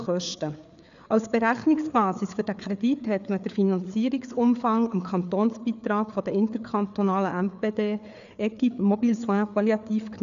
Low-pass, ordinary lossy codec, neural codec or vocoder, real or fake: 7.2 kHz; none; codec, 16 kHz, 4 kbps, FreqCodec, larger model; fake